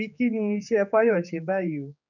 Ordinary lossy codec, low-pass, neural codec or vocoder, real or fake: none; 7.2 kHz; codec, 16 kHz, 4 kbps, X-Codec, HuBERT features, trained on general audio; fake